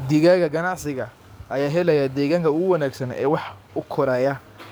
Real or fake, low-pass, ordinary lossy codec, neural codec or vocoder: fake; none; none; codec, 44.1 kHz, 7.8 kbps, DAC